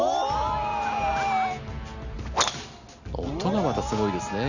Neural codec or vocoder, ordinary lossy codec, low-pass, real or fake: none; none; 7.2 kHz; real